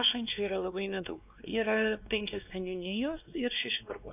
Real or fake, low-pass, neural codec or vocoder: fake; 3.6 kHz; codec, 16 kHz, 2 kbps, X-Codec, WavLM features, trained on Multilingual LibriSpeech